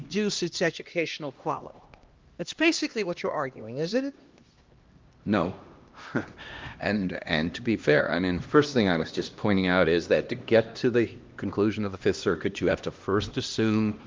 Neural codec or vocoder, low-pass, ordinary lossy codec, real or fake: codec, 16 kHz, 1 kbps, X-Codec, HuBERT features, trained on LibriSpeech; 7.2 kHz; Opus, 32 kbps; fake